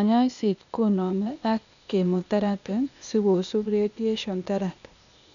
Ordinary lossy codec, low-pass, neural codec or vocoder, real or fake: none; 7.2 kHz; codec, 16 kHz, 0.8 kbps, ZipCodec; fake